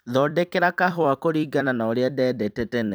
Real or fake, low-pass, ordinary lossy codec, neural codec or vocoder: fake; none; none; vocoder, 44.1 kHz, 128 mel bands every 256 samples, BigVGAN v2